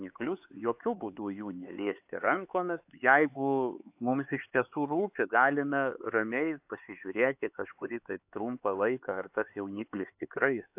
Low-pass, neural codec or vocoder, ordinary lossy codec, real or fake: 3.6 kHz; codec, 16 kHz, 4 kbps, X-Codec, HuBERT features, trained on LibriSpeech; AAC, 32 kbps; fake